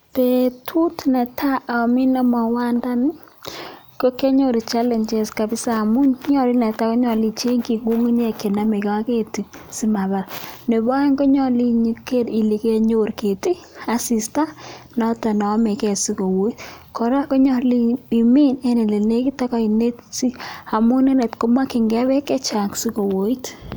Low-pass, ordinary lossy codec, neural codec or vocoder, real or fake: none; none; none; real